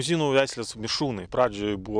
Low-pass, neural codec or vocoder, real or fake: 9.9 kHz; none; real